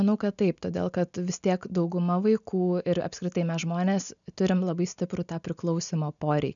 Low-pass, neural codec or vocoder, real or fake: 7.2 kHz; none; real